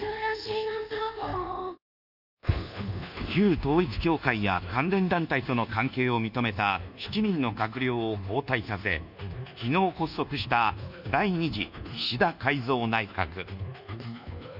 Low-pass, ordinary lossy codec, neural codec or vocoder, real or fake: 5.4 kHz; none; codec, 24 kHz, 1.2 kbps, DualCodec; fake